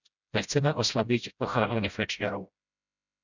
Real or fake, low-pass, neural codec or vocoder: fake; 7.2 kHz; codec, 16 kHz, 0.5 kbps, FreqCodec, smaller model